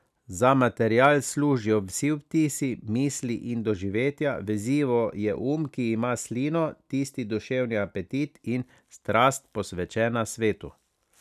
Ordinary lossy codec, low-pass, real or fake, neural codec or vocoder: none; 14.4 kHz; real; none